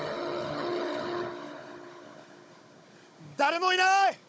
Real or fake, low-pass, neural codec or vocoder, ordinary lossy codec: fake; none; codec, 16 kHz, 16 kbps, FunCodec, trained on Chinese and English, 50 frames a second; none